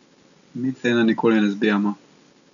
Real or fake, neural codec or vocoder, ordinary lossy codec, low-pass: real; none; none; 7.2 kHz